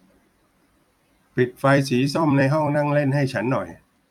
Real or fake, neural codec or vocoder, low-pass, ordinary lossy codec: fake; vocoder, 44.1 kHz, 128 mel bands every 256 samples, BigVGAN v2; 19.8 kHz; none